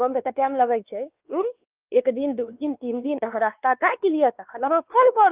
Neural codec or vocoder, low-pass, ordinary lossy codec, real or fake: codec, 16 kHz, 2 kbps, X-Codec, WavLM features, trained on Multilingual LibriSpeech; 3.6 kHz; Opus, 24 kbps; fake